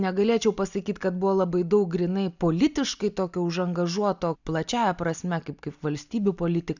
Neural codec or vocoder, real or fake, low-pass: none; real; 7.2 kHz